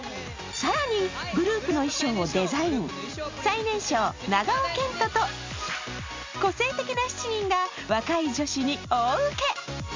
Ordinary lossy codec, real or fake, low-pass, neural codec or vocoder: MP3, 64 kbps; real; 7.2 kHz; none